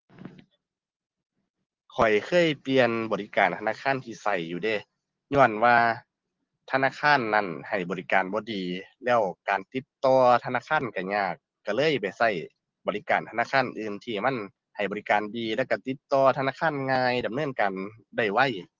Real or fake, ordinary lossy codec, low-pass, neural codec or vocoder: real; Opus, 16 kbps; 7.2 kHz; none